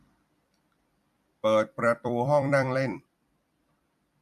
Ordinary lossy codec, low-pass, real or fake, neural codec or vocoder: MP3, 64 kbps; 14.4 kHz; fake; vocoder, 44.1 kHz, 128 mel bands every 256 samples, BigVGAN v2